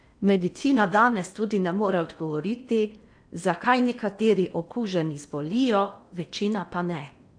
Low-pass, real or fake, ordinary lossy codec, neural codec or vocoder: 9.9 kHz; fake; AAC, 64 kbps; codec, 16 kHz in and 24 kHz out, 0.8 kbps, FocalCodec, streaming, 65536 codes